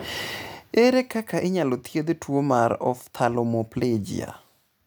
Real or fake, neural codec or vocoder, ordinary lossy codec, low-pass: real; none; none; none